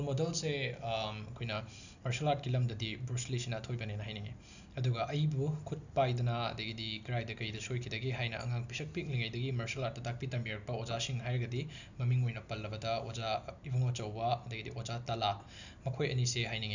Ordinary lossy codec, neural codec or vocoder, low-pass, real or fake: none; none; 7.2 kHz; real